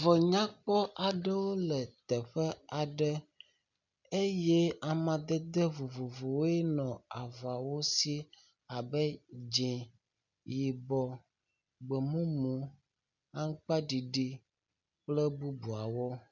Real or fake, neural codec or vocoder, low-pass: real; none; 7.2 kHz